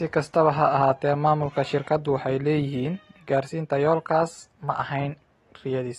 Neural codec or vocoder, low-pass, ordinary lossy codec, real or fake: none; 14.4 kHz; AAC, 32 kbps; real